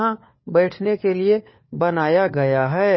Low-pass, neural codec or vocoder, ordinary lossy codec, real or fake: 7.2 kHz; codec, 16 kHz, 4 kbps, FunCodec, trained on LibriTTS, 50 frames a second; MP3, 24 kbps; fake